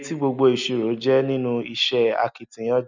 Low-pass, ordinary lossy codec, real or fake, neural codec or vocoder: 7.2 kHz; none; real; none